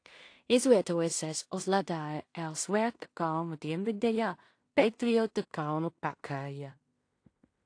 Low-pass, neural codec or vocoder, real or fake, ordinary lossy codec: 9.9 kHz; codec, 16 kHz in and 24 kHz out, 0.4 kbps, LongCat-Audio-Codec, two codebook decoder; fake; AAC, 48 kbps